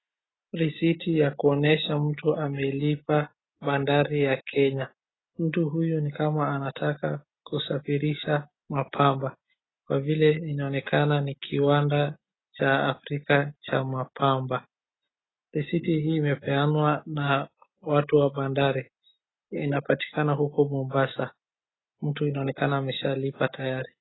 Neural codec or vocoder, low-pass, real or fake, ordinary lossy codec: none; 7.2 kHz; real; AAC, 16 kbps